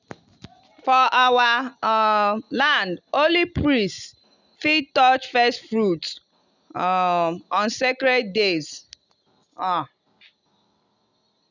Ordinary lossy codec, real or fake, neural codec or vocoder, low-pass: none; real; none; 7.2 kHz